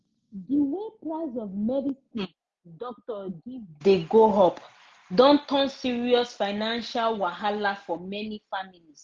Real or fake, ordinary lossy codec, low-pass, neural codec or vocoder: real; Opus, 32 kbps; 7.2 kHz; none